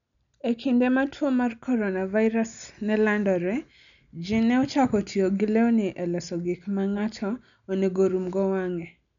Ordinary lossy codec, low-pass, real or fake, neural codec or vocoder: none; 7.2 kHz; real; none